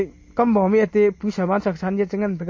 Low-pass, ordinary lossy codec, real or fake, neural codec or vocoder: 7.2 kHz; MP3, 32 kbps; real; none